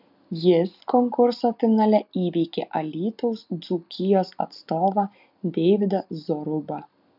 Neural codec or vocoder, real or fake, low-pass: none; real; 5.4 kHz